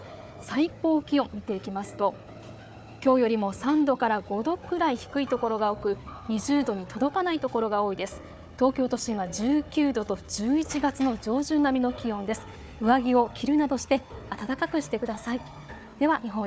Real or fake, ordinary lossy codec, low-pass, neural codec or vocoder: fake; none; none; codec, 16 kHz, 4 kbps, FunCodec, trained on Chinese and English, 50 frames a second